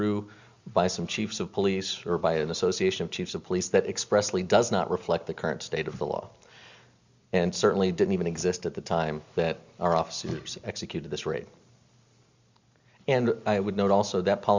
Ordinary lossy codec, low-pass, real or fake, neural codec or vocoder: Opus, 64 kbps; 7.2 kHz; real; none